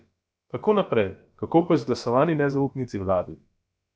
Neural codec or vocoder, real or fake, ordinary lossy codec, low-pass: codec, 16 kHz, about 1 kbps, DyCAST, with the encoder's durations; fake; none; none